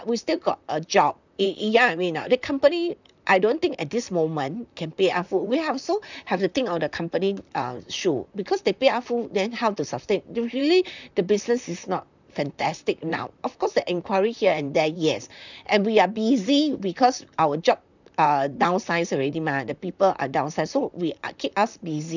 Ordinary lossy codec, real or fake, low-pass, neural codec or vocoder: none; fake; 7.2 kHz; vocoder, 44.1 kHz, 128 mel bands, Pupu-Vocoder